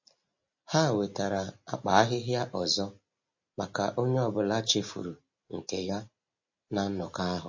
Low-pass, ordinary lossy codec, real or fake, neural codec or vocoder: 7.2 kHz; MP3, 32 kbps; real; none